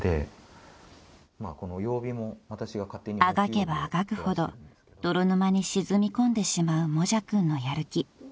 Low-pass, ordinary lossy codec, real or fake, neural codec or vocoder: none; none; real; none